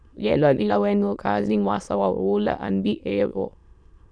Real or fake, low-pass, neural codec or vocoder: fake; 9.9 kHz; autoencoder, 22.05 kHz, a latent of 192 numbers a frame, VITS, trained on many speakers